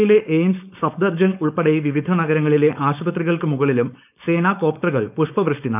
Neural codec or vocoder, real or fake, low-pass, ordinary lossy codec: codec, 16 kHz, 4.8 kbps, FACodec; fake; 3.6 kHz; none